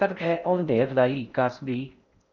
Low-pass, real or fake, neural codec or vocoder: 7.2 kHz; fake; codec, 16 kHz in and 24 kHz out, 0.6 kbps, FocalCodec, streaming, 4096 codes